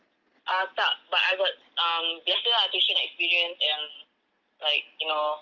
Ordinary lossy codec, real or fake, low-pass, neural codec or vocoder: Opus, 24 kbps; real; 7.2 kHz; none